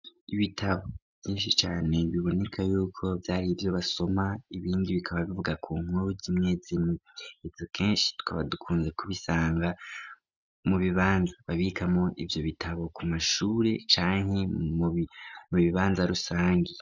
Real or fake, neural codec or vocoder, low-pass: real; none; 7.2 kHz